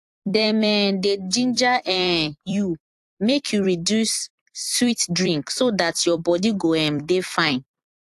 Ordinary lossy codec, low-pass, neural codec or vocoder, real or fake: AAC, 64 kbps; 14.4 kHz; vocoder, 44.1 kHz, 128 mel bands every 256 samples, BigVGAN v2; fake